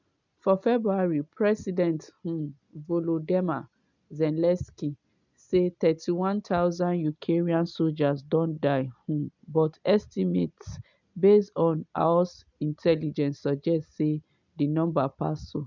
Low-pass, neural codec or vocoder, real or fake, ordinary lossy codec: 7.2 kHz; none; real; none